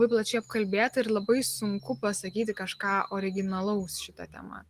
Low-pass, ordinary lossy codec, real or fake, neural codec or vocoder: 14.4 kHz; Opus, 24 kbps; real; none